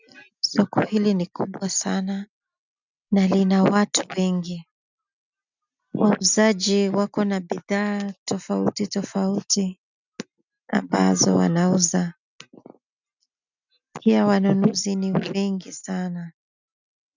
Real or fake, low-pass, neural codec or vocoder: real; 7.2 kHz; none